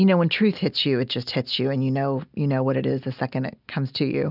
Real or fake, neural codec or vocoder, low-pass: real; none; 5.4 kHz